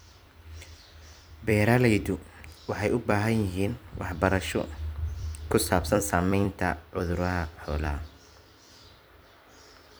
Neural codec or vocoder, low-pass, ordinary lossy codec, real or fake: vocoder, 44.1 kHz, 128 mel bands every 256 samples, BigVGAN v2; none; none; fake